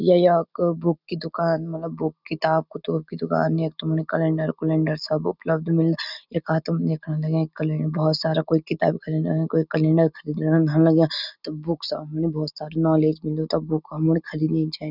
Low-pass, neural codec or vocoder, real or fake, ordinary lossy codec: 5.4 kHz; none; real; none